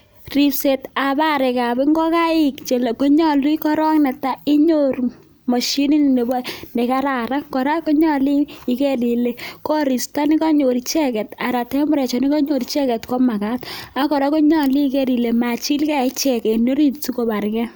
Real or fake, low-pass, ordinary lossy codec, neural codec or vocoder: real; none; none; none